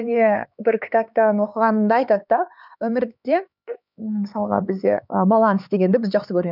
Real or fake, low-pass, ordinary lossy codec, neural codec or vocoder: fake; 5.4 kHz; none; codec, 16 kHz, 4 kbps, X-Codec, HuBERT features, trained on LibriSpeech